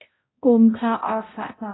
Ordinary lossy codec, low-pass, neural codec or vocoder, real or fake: AAC, 16 kbps; 7.2 kHz; codec, 16 kHz, 0.5 kbps, X-Codec, HuBERT features, trained on balanced general audio; fake